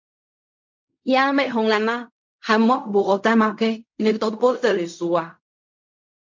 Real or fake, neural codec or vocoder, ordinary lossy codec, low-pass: fake; codec, 16 kHz in and 24 kHz out, 0.4 kbps, LongCat-Audio-Codec, fine tuned four codebook decoder; MP3, 48 kbps; 7.2 kHz